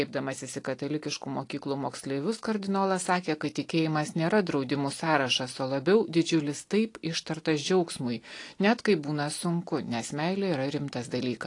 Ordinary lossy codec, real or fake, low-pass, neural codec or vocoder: AAC, 48 kbps; real; 10.8 kHz; none